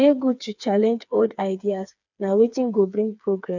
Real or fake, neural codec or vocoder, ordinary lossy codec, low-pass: fake; codec, 16 kHz, 4 kbps, FreqCodec, smaller model; none; 7.2 kHz